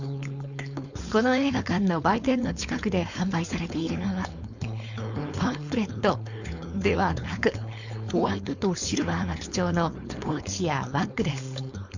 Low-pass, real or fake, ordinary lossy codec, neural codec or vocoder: 7.2 kHz; fake; none; codec, 16 kHz, 4.8 kbps, FACodec